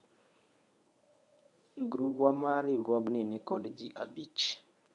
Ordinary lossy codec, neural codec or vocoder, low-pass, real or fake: MP3, 96 kbps; codec, 24 kHz, 0.9 kbps, WavTokenizer, medium speech release version 1; 10.8 kHz; fake